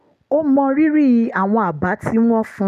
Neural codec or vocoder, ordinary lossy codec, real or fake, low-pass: none; none; real; 14.4 kHz